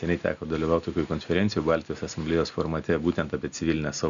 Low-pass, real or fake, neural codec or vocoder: 7.2 kHz; real; none